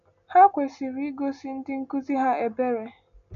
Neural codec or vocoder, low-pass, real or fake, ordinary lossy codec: none; 7.2 kHz; real; none